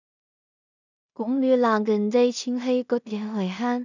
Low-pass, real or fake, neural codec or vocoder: 7.2 kHz; fake; codec, 16 kHz in and 24 kHz out, 0.4 kbps, LongCat-Audio-Codec, two codebook decoder